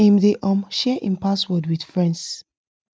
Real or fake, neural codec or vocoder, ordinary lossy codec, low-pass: real; none; none; none